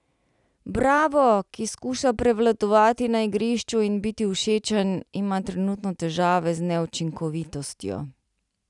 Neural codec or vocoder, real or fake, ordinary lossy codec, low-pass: none; real; none; 10.8 kHz